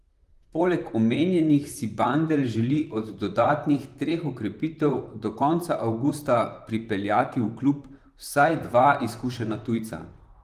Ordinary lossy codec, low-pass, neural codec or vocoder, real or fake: Opus, 32 kbps; 14.4 kHz; vocoder, 44.1 kHz, 128 mel bands, Pupu-Vocoder; fake